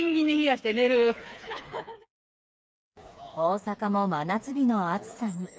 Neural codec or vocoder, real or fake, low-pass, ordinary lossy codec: codec, 16 kHz, 4 kbps, FreqCodec, smaller model; fake; none; none